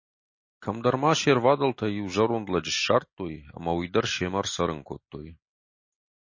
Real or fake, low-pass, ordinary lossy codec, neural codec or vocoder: real; 7.2 kHz; MP3, 32 kbps; none